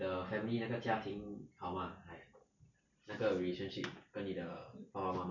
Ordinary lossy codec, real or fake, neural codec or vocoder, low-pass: none; real; none; 7.2 kHz